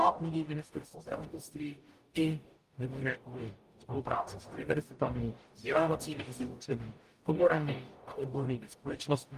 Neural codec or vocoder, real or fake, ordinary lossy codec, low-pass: codec, 44.1 kHz, 0.9 kbps, DAC; fake; Opus, 32 kbps; 14.4 kHz